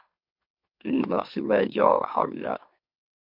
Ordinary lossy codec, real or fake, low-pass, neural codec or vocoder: MP3, 48 kbps; fake; 5.4 kHz; autoencoder, 44.1 kHz, a latent of 192 numbers a frame, MeloTTS